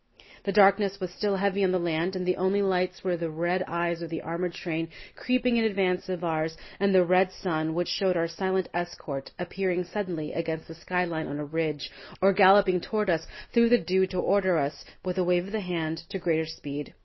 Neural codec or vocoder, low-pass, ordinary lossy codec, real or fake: none; 7.2 kHz; MP3, 24 kbps; real